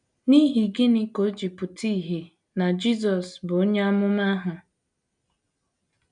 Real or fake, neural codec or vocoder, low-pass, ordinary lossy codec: real; none; 9.9 kHz; none